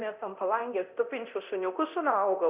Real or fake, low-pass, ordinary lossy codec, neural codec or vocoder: fake; 3.6 kHz; Opus, 64 kbps; codec, 24 kHz, 0.9 kbps, DualCodec